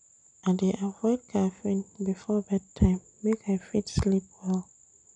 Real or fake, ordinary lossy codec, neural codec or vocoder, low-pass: real; none; none; 9.9 kHz